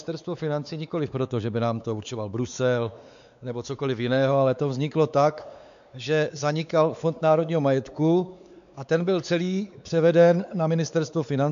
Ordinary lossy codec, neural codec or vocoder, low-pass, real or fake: AAC, 96 kbps; codec, 16 kHz, 4 kbps, X-Codec, WavLM features, trained on Multilingual LibriSpeech; 7.2 kHz; fake